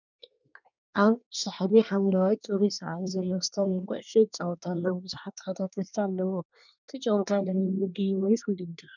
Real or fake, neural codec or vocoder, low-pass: fake; codec, 24 kHz, 1 kbps, SNAC; 7.2 kHz